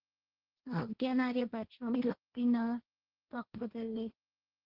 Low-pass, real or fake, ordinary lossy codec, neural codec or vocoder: 5.4 kHz; fake; Opus, 16 kbps; codec, 16 kHz, 1.1 kbps, Voila-Tokenizer